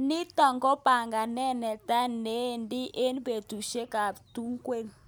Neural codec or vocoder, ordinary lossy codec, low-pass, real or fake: none; none; none; real